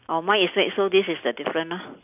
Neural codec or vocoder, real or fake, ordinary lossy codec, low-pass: none; real; none; 3.6 kHz